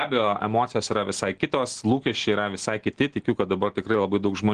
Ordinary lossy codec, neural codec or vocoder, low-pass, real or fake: Opus, 16 kbps; none; 9.9 kHz; real